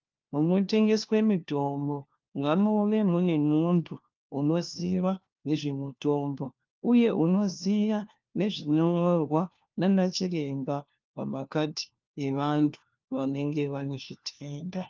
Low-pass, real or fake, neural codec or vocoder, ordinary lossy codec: 7.2 kHz; fake; codec, 16 kHz, 1 kbps, FunCodec, trained on LibriTTS, 50 frames a second; Opus, 32 kbps